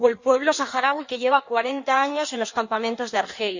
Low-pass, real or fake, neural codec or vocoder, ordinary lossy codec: 7.2 kHz; fake; codec, 16 kHz in and 24 kHz out, 1.1 kbps, FireRedTTS-2 codec; Opus, 64 kbps